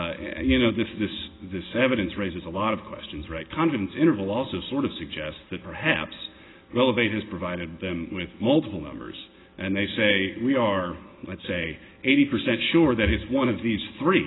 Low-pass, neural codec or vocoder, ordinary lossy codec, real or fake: 7.2 kHz; none; AAC, 16 kbps; real